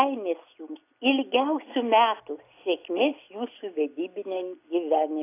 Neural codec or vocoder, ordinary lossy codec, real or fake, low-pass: none; AAC, 24 kbps; real; 3.6 kHz